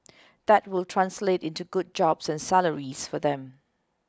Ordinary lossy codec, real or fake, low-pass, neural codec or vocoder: none; real; none; none